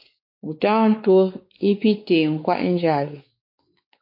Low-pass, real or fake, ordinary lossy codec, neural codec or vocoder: 5.4 kHz; fake; MP3, 32 kbps; codec, 16 kHz, 4 kbps, X-Codec, WavLM features, trained on Multilingual LibriSpeech